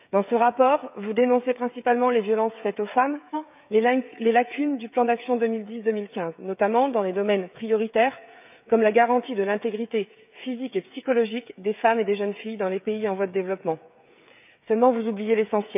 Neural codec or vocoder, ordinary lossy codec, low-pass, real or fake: codec, 16 kHz, 16 kbps, FreqCodec, smaller model; none; 3.6 kHz; fake